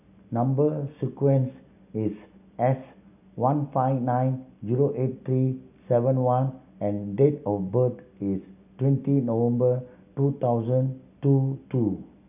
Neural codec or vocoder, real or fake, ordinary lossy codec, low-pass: none; real; none; 3.6 kHz